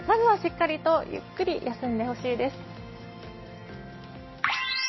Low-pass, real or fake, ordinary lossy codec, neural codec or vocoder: 7.2 kHz; real; MP3, 24 kbps; none